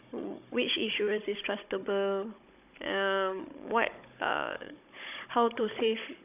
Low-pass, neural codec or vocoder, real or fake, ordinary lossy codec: 3.6 kHz; codec, 16 kHz, 16 kbps, FunCodec, trained on Chinese and English, 50 frames a second; fake; none